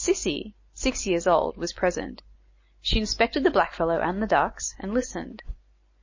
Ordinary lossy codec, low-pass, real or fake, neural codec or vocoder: MP3, 32 kbps; 7.2 kHz; real; none